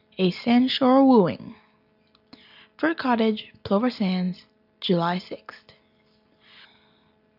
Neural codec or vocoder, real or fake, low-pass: none; real; 5.4 kHz